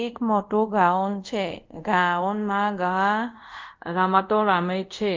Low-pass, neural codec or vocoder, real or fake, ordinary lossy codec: 7.2 kHz; codec, 24 kHz, 0.5 kbps, DualCodec; fake; Opus, 32 kbps